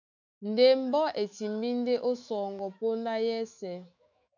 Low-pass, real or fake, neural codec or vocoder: 7.2 kHz; fake; autoencoder, 48 kHz, 128 numbers a frame, DAC-VAE, trained on Japanese speech